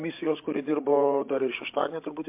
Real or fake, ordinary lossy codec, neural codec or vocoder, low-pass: fake; MP3, 32 kbps; codec, 16 kHz, 8 kbps, FreqCodec, larger model; 3.6 kHz